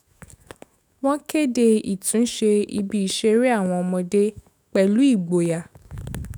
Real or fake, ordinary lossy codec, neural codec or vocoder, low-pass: fake; none; autoencoder, 48 kHz, 128 numbers a frame, DAC-VAE, trained on Japanese speech; none